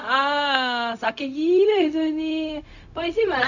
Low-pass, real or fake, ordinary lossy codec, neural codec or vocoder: 7.2 kHz; fake; none; codec, 16 kHz, 0.4 kbps, LongCat-Audio-Codec